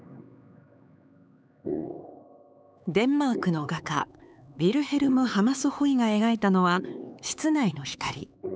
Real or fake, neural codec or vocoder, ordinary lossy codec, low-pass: fake; codec, 16 kHz, 4 kbps, X-Codec, HuBERT features, trained on LibriSpeech; none; none